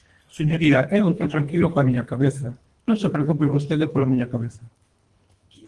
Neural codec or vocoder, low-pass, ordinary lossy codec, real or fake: codec, 24 kHz, 1.5 kbps, HILCodec; 10.8 kHz; Opus, 24 kbps; fake